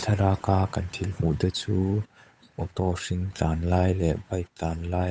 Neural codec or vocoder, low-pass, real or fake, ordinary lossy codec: codec, 16 kHz, 8 kbps, FunCodec, trained on Chinese and English, 25 frames a second; none; fake; none